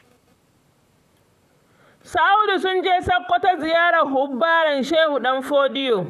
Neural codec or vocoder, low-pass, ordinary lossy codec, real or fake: vocoder, 44.1 kHz, 128 mel bands, Pupu-Vocoder; 14.4 kHz; none; fake